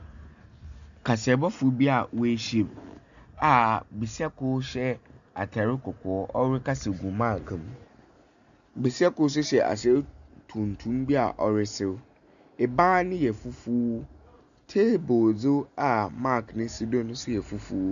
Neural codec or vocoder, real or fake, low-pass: none; real; 7.2 kHz